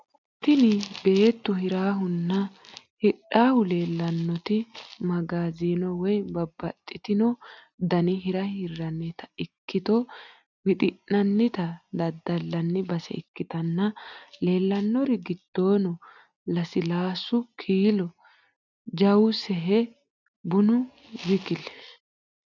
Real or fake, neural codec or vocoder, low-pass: real; none; 7.2 kHz